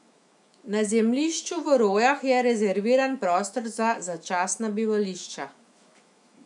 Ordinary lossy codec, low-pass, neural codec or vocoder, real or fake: none; 10.8 kHz; autoencoder, 48 kHz, 128 numbers a frame, DAC-VAE, trained on Japanese speech; fake